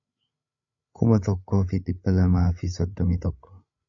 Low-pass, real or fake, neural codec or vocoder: 7.2 kHz; fake; codec, 16 kHz, 8 kbps, FreqCodec, larger model